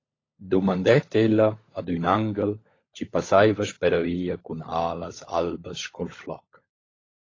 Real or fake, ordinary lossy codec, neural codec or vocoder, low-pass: fake; AAC, 32 kbps; codec, 16 kHz, 16 kbps, FunCodec, trained on LibriTTS, 50 frames a second; 7.2 kHz